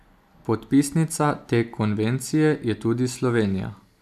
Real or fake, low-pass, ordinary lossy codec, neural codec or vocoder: real; 14.4 kHz; none; none